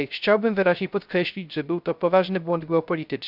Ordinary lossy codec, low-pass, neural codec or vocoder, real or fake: none; 5.4 kHz; codec, 16 kHz, 0.3 kbps, FocalCodec; fake